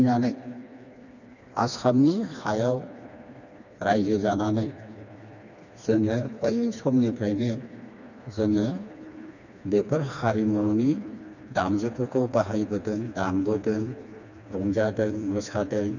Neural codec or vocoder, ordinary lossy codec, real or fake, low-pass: codec, 16 kHz, 2 kbps, FreqCodec, smaller model; none; fake; 7.2 kHz